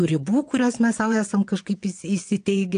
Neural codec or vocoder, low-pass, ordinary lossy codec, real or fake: vocoder, 22.05 kHz, 80 mel bands, WaveNeXt; 9.9 kHz; AAC, 64 kbps; fake